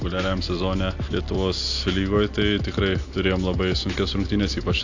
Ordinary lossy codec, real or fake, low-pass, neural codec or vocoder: AAC, 48 kbps; real; 7.2 kHz; none